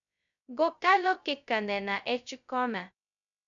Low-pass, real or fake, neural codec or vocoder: 7.2 kHz; fake; codec, 16 kHz, 0.2 kbps, FocalCodec